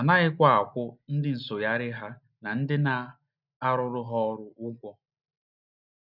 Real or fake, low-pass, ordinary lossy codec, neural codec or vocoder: real; 5.4 kHz; none; none